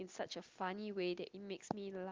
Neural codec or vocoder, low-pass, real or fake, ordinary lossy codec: none; 7.2 kHz; real; Opus, 32 kbps